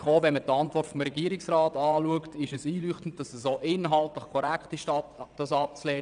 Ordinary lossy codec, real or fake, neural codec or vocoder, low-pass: none; fake; vocoder, 22.05 kHz, 80 mel bands, WaveNeXt; 9.9 kHz